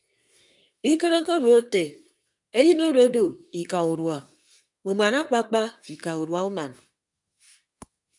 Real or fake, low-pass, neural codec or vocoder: fake; 10.8 kHz; codec, 24 kHz, 1 kbps, SNAC